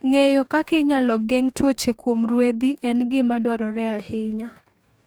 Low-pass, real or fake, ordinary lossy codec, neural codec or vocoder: none; fake; none; codec, 44.1 kHz, 2.6 kbps, DAC